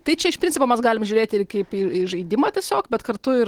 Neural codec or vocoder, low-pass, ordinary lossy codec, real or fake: none; 19.8 kHz; Opus, 16 kbps; real